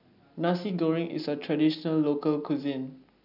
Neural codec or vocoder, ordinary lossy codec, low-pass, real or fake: none; none; 5.4 kHz; real